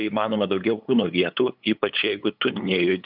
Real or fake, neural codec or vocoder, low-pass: fake; codec, 16 kHz, 8 kbps, FunCodec, trained on LibriTTS, 25 frames a second; 5.4 kHz